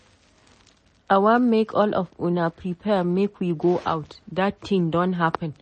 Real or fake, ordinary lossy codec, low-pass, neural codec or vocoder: real; MP3, 32 kbps; 10.8 kHz; none